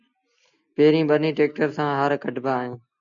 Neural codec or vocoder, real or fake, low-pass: none; real; 7.2 kHz